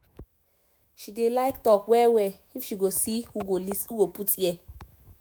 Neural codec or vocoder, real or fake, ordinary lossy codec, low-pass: autoencoder, 48 kHz, 128 numbers a frame, DAC-VAE, trained on Japanese speech; fake; none; none